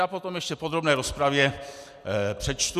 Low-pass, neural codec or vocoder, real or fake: 14.4 kHz; none; real